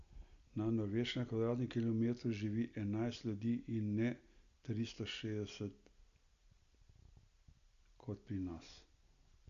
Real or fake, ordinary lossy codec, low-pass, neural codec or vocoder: real; Opus, 64 kbps; 7.2 kHz; none